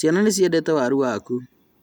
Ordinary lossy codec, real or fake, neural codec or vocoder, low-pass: none; real; none; none